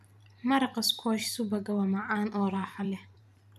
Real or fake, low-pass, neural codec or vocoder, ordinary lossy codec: fake; 14.4 kHz; vocoder, 44.1 kHz, 128 mel bands every 512 samples, BigVGAN v2; none